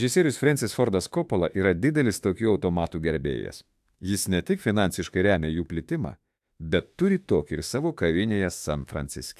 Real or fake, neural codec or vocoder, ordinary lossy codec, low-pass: fake; autoencoder, 48 kHz, 32 numbers a frame, DAC-VAE, trained on Japanese speech; AAC, 96 kbps; 14.4 kHz